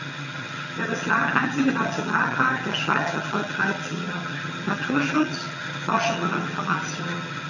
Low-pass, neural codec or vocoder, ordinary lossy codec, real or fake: 7.2 kHz; vocoder, 22.05 kHz, 80 mel bands, HiFi-GAN; none; fake